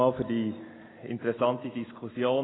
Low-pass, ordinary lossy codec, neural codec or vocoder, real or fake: 7.2 kHz; AAC, 16 kbps; none; real